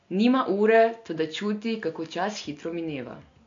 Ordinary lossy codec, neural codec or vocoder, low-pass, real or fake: AAC, 48 kbps; none; 7.2 kHz; real